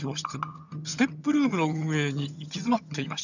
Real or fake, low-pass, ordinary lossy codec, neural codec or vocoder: fake; 7.2 kHz; none; vocoder, 22.05 kHz, 80 mel bands, HiFi-GAN